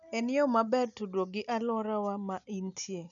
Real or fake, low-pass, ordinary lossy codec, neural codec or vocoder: real; 7.2 kHz; none; none